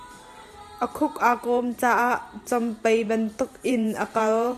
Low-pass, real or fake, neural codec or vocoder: 14.4 kHz; fake; vocoder, 44.1 kHz, 128 mel bands every 512 samples, BigVGAN v2